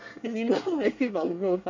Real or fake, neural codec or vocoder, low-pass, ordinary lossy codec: fake; codec, 24 kHz, 1 kbps, SNAC; 7.2 kHz; MP3, 64 kbps